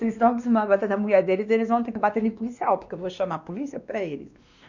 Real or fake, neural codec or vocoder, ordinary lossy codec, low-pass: fake; codec, 16 kHz, 2 kbps, X-Codec, WavLM features, trained on Multilingual LibriSpeech; none; 7.2 kHz